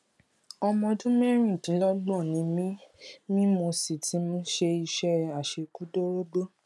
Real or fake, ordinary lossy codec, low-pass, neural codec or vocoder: real; none; none; none